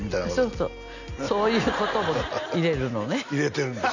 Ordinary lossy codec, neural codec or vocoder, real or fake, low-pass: none; none; real; 7.2 kHz